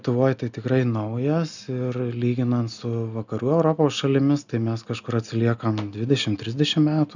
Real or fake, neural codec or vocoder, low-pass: real; none; 7.2 kHz